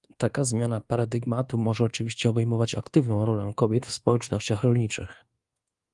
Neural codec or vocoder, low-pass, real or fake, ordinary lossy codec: codec, 24 kHz, 1.2 kbps, DualCodec; 10.8 kHz; fake; Opus, 24 kbps